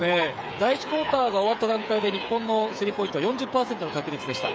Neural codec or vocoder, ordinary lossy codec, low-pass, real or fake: codec, 16 kHz, 8 kbps, FreqCodec, smaller model; none; none; fake